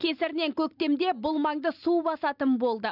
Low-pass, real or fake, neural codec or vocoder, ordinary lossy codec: 5.4 kHz; real; none; none